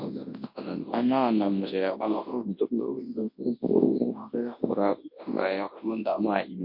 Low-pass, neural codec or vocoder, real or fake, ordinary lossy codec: 5.4 kHz; codec, 24 kHz, 0.9 kbps, WavTokenizer, large speech release; fake; MP3, 32 kbps